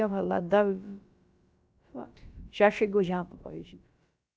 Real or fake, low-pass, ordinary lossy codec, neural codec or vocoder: fake; none; none; codec, 16 kHz, about 1 kbps, DyCAST, with the encoder's durations